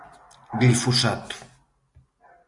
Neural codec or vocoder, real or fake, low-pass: none; real; 10.8 kHz